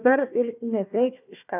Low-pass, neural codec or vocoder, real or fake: 3.6 kHz; codec, 16 kHz, 1 kbps, FunCodec, trained on Chinese and English, 50 frames a second; fake